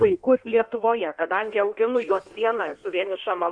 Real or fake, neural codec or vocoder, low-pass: fake; codec, 16 kHz in and 24 kHz out, 1.1 kbps, FireRedTTS-2 codec; 9.9 kHz